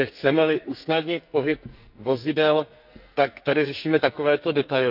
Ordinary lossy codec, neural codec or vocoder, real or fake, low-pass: none; codec, 44.1 kHz, 2.6 kbps, SNAC; fake; 5.4 kHz